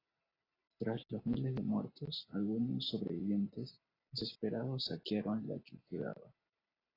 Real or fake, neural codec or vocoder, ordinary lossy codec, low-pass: real; none; AAC, 24 kbps; 5.4 kHz